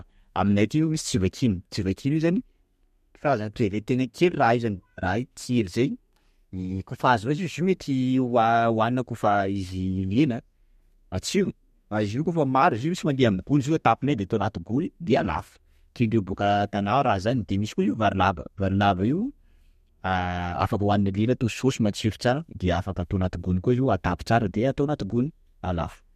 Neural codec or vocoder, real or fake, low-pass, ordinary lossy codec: codec, 32 kHz, 1.9 kbps, SNAC; fake; 14.4 kHz; MP3, 64 kbps